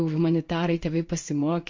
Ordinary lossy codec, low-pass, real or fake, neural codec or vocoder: MP3, 48 kbps; 7.2 kHz; fake; codec, 16 kHz in and 24 kHz out, 1 kbps, XY-Tokenizer